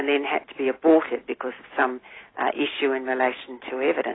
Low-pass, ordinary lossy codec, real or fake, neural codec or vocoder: 7.2 kHz; AAC, 16 kbps; real; none